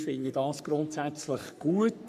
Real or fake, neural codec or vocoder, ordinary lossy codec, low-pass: fake; codec, 44.1 kHz, 3.4 kbps, Pupu-Codec; MP3, 96 kbps; 14.4 kHz